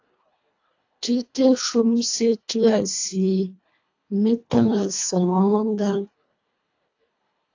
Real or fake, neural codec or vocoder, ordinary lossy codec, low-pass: fake; codec, 24 kHz, 1.5 kbps, HILCodec; AAC, 48 kbps; 7.2 kHz